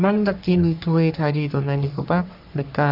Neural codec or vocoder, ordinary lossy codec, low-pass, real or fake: codec, 44.1 kHz, 3.4 kbps, Pupu-Codec; none; 5.4 kHz; fake